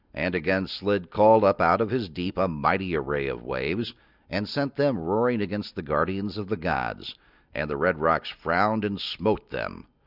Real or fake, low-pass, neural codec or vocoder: real; 5.4 kHz; none